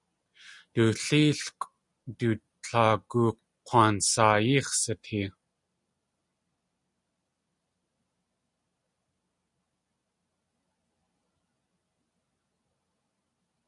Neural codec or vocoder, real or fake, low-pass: none; real; 10.8 kHz